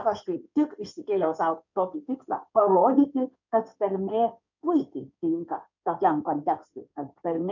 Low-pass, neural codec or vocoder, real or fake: 7.2 kHz; codec, 16 kHz in and 24 kHz out, 2.2 kbps, FireRedTTS-2 codec; fake